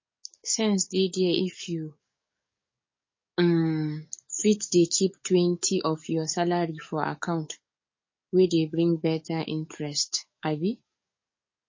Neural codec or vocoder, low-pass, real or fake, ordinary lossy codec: codec, 44.1 kHz, 7.8 kbps, DAC; 7.2 kHz; fake; MP3, 32 kbps